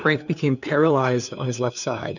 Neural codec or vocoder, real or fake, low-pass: codec, 16 kHz, 2 kbps, FunCodec, trained on LibriTTS, 25 frames a second; fake; 7.2 kHz